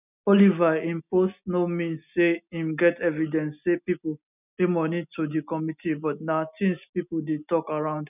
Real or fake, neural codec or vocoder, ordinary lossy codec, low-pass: real; none; none; 3.6 kHz